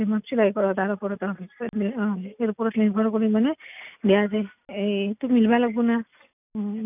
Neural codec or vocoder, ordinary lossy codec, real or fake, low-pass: none; none; real; 3.6 kHz